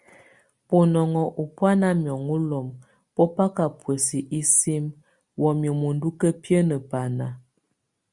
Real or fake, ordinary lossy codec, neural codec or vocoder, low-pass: real; Opus, 64 kbps; none; 10.8 kHz